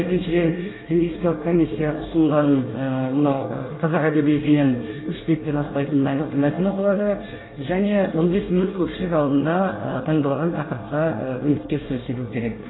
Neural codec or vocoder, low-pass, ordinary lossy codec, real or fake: codec, 24 kHz, 1 kbps, SNAC; 7.2 kHz; AAC, 16 kbps; fake